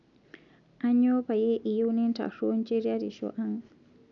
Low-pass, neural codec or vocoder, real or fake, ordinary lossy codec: 7.2 kHz; none; real; none